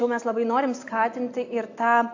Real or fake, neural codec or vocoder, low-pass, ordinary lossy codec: real; none; 7.2 kHz; AAC, 48 kbps